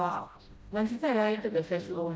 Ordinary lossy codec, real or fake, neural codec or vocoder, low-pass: none; fake; codec, 16 kHz, 0.5 kbps, FreqCodec, smaller model; none